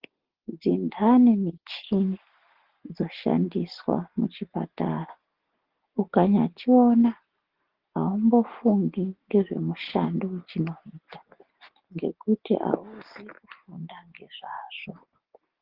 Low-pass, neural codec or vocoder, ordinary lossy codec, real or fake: 5.4 kHz; none; Opus, 16 kbps; real